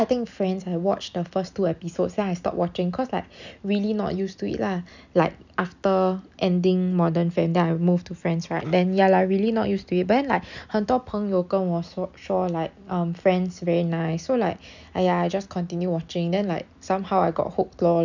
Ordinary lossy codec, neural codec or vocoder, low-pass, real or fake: none; none; 7.2 kHz; real